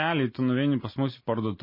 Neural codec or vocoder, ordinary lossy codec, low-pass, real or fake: none; MP3, 24 kbps; 5.4 kHz; real